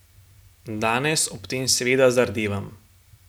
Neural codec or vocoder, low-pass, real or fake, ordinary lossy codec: none; none; real; none